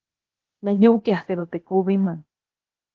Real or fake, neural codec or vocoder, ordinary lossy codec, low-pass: fake; codec, 16 kHz, 0.8 kbps, ZipCodec; Opus, 16 kbps; 7.2 kHz